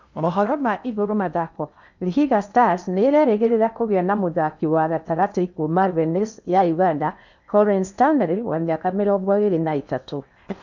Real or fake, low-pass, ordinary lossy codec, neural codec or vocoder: fake; 7.2 kHz; none; codec, 16 kHz in and 24 kHz out, 0.6 kbps, FocalCodec, streaming, 2048 codes